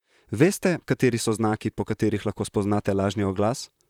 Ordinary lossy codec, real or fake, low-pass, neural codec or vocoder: none; fake; 19.8 kHz; vocoder, 44.1 kHz, 128 mel bands, Pupu-Vocoder